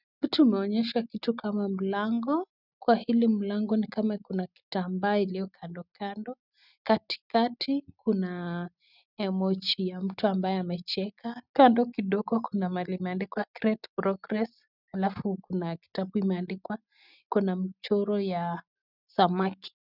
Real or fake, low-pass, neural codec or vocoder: real; 5.4 kHz; none